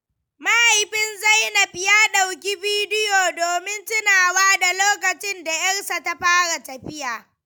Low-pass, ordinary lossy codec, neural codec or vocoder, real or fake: none; none; none; real